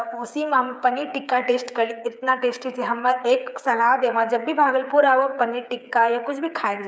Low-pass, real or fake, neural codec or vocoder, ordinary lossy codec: none; fake; codec, 16 kHz, 8 kbps, FreqCodec, smaller model; none